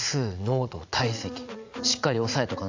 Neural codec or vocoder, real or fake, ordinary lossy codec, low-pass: vocoder, 44.1 kHz, 80 mel bands, Vocos; fake; AAC, 48 kbps; 7.2 kHz